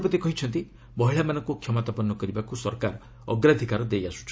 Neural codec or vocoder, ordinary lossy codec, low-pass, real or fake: none; none; none; real